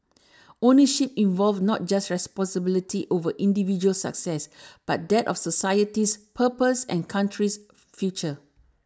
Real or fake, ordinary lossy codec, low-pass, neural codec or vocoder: real; none; none; none